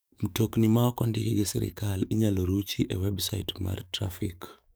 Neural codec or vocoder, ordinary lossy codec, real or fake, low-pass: codec, 44.1 kHz, 7.8 kbps, DAC; none; fake; none